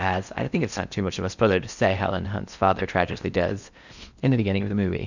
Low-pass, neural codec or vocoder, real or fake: 7.2 kHz; codec, 16 kHz in and 24 kHz out, 0.6 kbps, FocalCodec, streaming, 4096 codes; fake